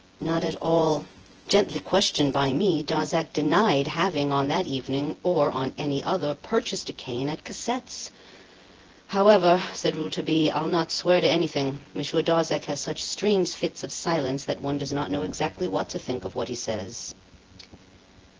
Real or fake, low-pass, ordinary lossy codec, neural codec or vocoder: fake; 7.2 kHz; Opus, 16 kbps; vocoder, 24 kHz, 100 mel bands, Vocos